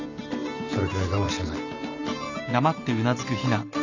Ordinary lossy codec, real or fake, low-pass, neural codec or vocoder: none; real; 7.2 kHz; none